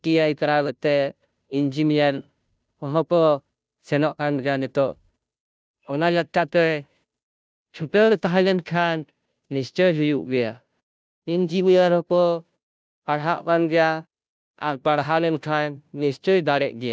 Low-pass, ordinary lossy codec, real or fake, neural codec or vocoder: none; none; fake; codec, 16 kHz, 0.5 kbps, FunCodec, trained on Chinese and English, 25 frames a second